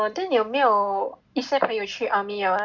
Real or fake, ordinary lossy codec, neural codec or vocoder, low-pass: real; none; none; 7.2 kHz